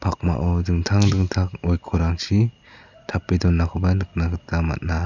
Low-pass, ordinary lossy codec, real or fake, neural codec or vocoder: 7.2 kHz; none; real; none